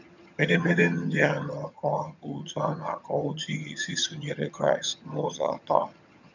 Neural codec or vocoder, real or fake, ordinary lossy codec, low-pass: vocoder, 22.05 kHz, 80 mel bands, HiFi-GAN; fake; none; 7.2 kHz